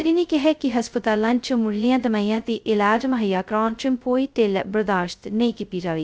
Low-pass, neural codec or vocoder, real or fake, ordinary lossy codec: none; codec, 16 kHz, 0.2 kbps, FocalCodec; fake; none